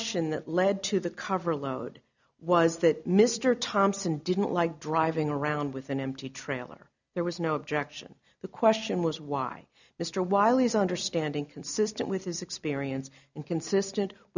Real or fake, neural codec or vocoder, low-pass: real; none; 7.2 kHz